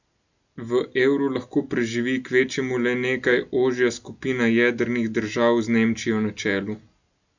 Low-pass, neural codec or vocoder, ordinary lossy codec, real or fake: 7.2 kHz; none; none; real